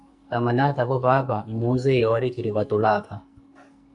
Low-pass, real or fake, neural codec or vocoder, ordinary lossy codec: 10.8 kHz; fake; codec, 44.1 kHz, 2.6 kbps, SNAC; AAC, 64 kbps